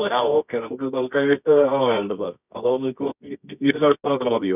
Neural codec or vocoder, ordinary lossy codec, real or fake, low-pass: codec, 24 kHz, 0.9 kbps, WavTokenizer, medium music audio release; none; fake; 3.6 kHz